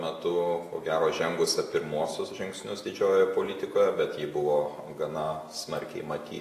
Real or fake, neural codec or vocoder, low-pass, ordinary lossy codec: real; none; 14.4 kHz; AAC, 48 kbps